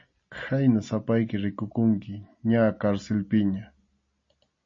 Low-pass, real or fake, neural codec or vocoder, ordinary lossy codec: 7.2 kHz; real; none; MP3, 32 kbps